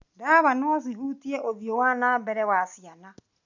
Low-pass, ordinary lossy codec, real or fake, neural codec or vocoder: 7.2 kHz; none; real; none